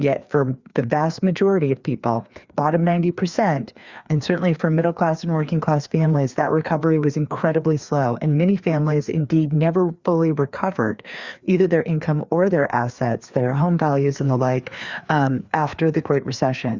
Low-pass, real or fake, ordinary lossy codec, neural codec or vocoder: 7.2 kHz; fake; Opus, 64 kbps; codec, 16 kHz, 2 kbps, FreqCodec, larger model